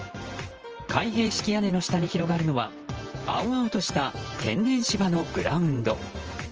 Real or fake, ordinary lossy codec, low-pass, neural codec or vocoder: fake; Opus, 24 kbps; 7.2 kHz; vocoder, 44.1 kHz, 128 mel bands, Pupu-Vocoder